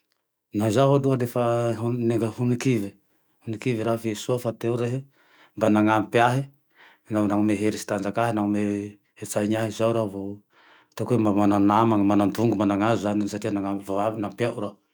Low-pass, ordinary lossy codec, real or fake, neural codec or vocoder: none; none; fake; autoencoder, 48 kHz, 128 numbers a frame, DAC-VAE, trained on Japanese speech